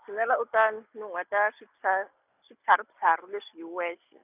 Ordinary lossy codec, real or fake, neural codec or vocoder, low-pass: none; real; none; 3.6 kHz